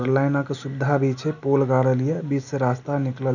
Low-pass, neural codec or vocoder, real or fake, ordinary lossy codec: 7.2 kHz; none; real; none